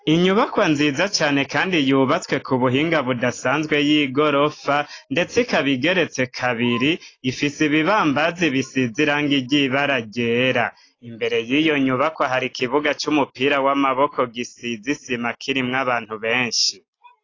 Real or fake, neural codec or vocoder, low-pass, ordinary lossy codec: real; none; 7.2 kHz; AAC, 32 kbps